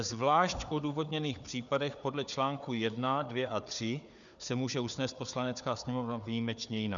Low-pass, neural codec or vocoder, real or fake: 7.2 kHz; codec, 16 kHz, 4 kbps, FunCodec, trained on Chinese and English, 50 frames a second; fake